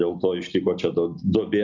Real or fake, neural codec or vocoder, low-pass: real; none; 7.2 kHz